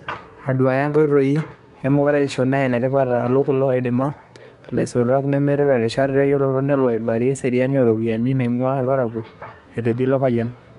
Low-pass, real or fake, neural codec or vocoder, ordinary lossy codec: 10.8 kHz; fake; codec, 24 kHz, 1 kbps, SNAC; none